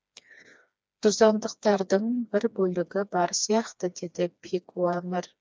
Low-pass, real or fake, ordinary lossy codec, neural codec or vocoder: none; fake; none; codec, 16 kHz, 2 kbps, FreqCodec, smaller model